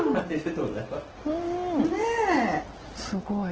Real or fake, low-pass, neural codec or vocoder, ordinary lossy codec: real; 7.2 kHz; none; Opus, 16 kbps